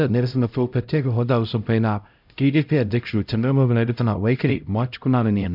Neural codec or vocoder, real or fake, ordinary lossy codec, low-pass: codec, 16 kHz, 0.5 kbps, X-Codec, HuBERT features, trained on LibriSpeech; fake; none; 5.4 kHz